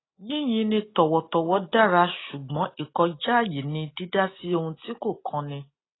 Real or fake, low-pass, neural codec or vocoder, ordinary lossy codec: real; 7.2 kHz; none; AAC, 16 kbps